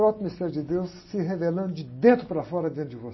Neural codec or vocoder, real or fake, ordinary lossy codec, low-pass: none; real; MP3, 24 kbps; 7.2 kHz